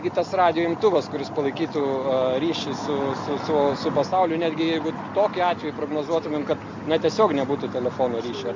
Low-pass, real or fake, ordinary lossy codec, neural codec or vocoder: 7.2 kHz; real; MP3, 64 kbps; none